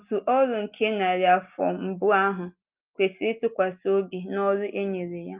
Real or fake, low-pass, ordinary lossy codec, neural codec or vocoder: real; 3.6 kHz; Opus, 32 kbps; none